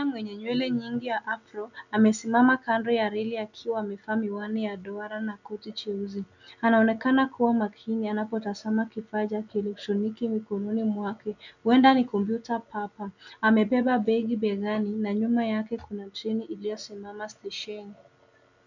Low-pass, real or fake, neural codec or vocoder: 7.2 kHz; real; none